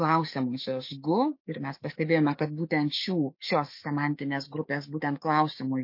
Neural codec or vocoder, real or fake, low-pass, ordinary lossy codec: codec, 16 kHz, 6 kbps, DAC; fake; 5.4 kHz; MP3, 32 kbps